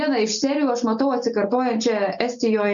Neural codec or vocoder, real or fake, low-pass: none; real; 7.2 kHz